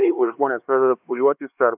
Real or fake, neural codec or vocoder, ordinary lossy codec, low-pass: fake; codec, 16 kHz, 2 kbps, X-Codec, HuBERT features, trained on LibriSpeech; AAC, 32 kbps; 3.6 kHz